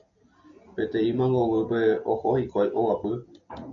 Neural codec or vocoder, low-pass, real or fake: none; 7.2 kHz; real